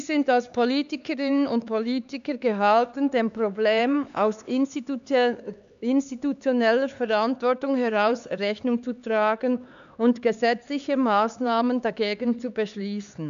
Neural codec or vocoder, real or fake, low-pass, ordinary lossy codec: codec, 16 kHz, 4 kbps, X-Codec, HuBERT features, trained on LibriSpeech; fake; 7.2 kHz; AAC, 96 kbps